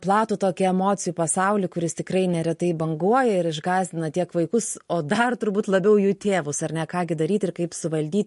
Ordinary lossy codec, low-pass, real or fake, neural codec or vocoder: MP3, 48 kbps; 14.4 kHz; real; none